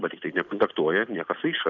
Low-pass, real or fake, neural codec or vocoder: 7.2 kHz; real; none